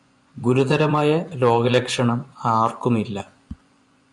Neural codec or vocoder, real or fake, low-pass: vocoder, 24 kHz, 100 mel bands, Vocos; fake; 10.8 kHz